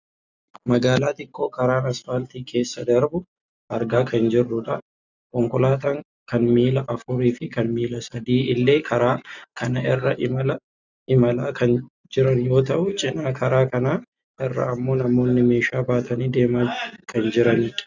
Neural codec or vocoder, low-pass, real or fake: none; 7.2 kHz; real